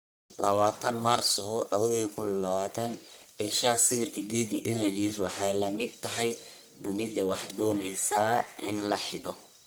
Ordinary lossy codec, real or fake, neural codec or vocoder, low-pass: none; fake; codec, 44.1 kHz, 1.7 kbps, Pupu-Codec; none